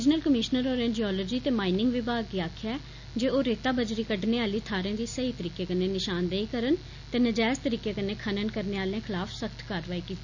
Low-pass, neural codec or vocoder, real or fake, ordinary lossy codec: 7.2 kHz; none; real; none